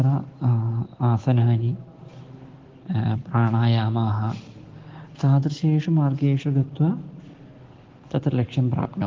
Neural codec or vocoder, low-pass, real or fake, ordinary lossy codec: none; 7.2 kHz; real; Opus, 16 kbps